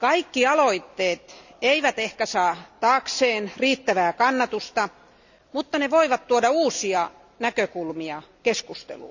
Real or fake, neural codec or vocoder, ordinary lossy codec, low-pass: real; none; none; 7.2 kHz